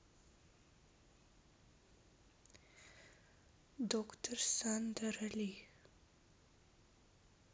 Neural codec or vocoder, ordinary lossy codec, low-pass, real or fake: none; none; none; real